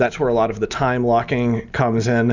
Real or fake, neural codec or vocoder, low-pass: real; none; 7.2 kHz